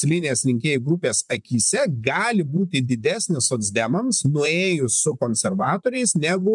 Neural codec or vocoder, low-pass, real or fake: vocoder, 44.1 kHz, 128 mel bands, Pupu-Vocoder; 10.8 kHz; fake